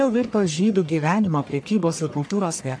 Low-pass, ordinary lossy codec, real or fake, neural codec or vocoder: 9.9 kHz; AAC, 64 kbps; fake; codec, 44.1 kHz, 1.7 kbps, Pupu-Codec